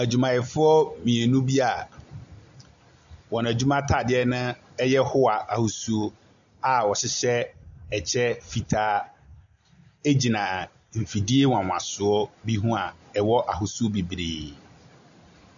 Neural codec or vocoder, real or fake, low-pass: none; real; 7.2 kHz